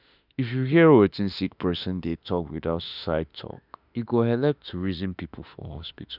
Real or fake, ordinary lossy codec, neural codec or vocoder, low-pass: fake; none; autoencoder, 48 kHz, 32 numbers a frame, DAC-VAE, trained on Japanese speech; 5.4 kHz